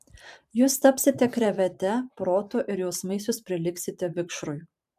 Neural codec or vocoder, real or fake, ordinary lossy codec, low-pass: vocoder, 44.1 kHz, 128 mel bands every 512 samples, BigVGAN v2; fake; MP3, 96 kbps; 14.4 kHz